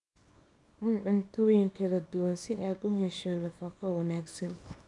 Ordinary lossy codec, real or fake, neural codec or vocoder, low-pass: none; fake; codec, 24 kHz, 0.9 kbps, WavTokenizer, small release; 10.8 kHz